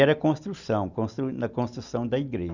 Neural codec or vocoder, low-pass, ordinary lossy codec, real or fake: none; 7.2 kHz; none; real